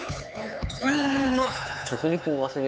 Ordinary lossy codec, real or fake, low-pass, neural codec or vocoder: none; fake; none; codec, 16 kHz, 4 kbps, X-Codec, HuBERT features, trained on LibriSpeech